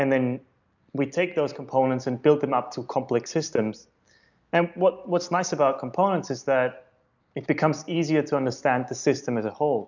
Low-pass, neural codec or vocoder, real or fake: 7.2 kHz; none; real